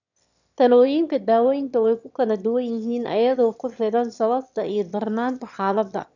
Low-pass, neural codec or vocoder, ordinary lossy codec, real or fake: 7.2 kHz; autoencoder, 22.05 kHz, a latent of 192 numbers a frame, VITS, trained on one speaker; none; fake